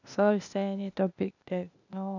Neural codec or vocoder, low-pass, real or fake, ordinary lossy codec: codec, 16 kHz, 0.8 kbps, ZipCodec; 7.2 kHz; fake; none